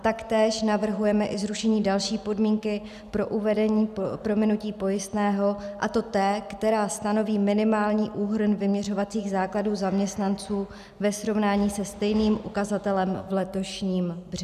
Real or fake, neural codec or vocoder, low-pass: real; none; 14.4 kHz